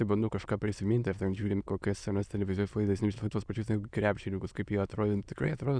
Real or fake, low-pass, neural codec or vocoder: fake; 9.9 kHz; autoencoder, 22.05 kHz, a latent of 192 numbers a frame, VITS, trained on many speakers